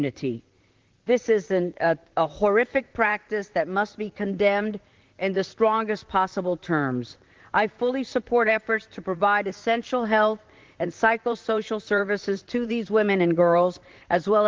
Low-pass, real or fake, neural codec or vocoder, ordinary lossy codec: 7.2 kHz; fake; vocoder, 44.1 kHz, 128 mel bands, Pupu-Vocoder; Opus, 16 kbps